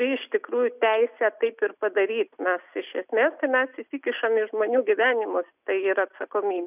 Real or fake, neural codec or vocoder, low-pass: real; none; 3.6 kHz